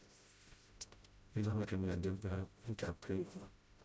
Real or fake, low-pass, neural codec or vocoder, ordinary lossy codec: fake; none; codec, 16 kHz, 0.5 kbps, FreqCodec, smaller model; none